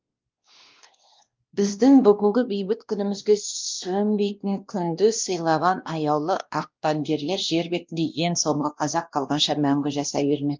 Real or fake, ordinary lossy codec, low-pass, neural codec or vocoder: fake; Opus, 24 kbps; 7.2 kHz; codec, 16 kHz, 1 kbps, X-Codec, WavLM features, trained on Multilingual LibriSpeech